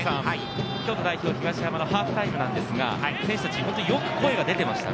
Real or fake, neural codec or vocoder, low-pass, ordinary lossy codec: real; none; none; none